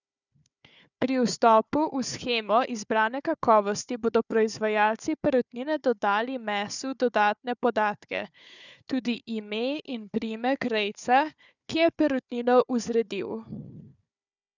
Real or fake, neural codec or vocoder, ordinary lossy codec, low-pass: fake; codec, 16 kHz, 4 kbps, FunCodec, trained on Chinese and English, 50 frames a second; none; 7.2 kHz